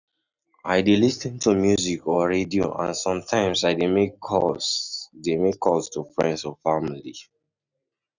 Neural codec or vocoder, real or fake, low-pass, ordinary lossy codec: none; real; 7.2 kHz; none